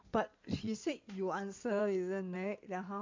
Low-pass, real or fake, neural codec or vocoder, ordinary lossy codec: 7.2 kHz; fake; codec, 16 kHz in and 24 kHz out, 2.2 kbps, FireRedTTS-2 codec; MP3, 48 kbps